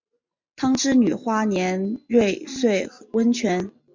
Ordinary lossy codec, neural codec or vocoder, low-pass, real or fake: MP3, 64 kbps; none; 7.2 kHz; real